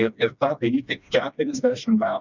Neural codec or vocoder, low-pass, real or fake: codec, 16 kHz, 1 kbps, FreqCodec, smaller model; 7.2 kHz; fake